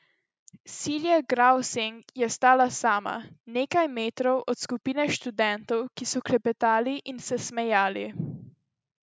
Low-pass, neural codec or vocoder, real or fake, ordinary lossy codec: none; none; real; none